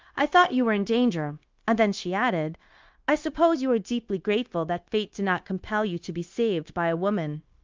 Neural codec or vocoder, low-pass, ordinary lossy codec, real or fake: codec, 16 kHz, 0.9 kbps, LongCat-Audio-Codec; 7.2 kHz; Opus, 24 kbps; fake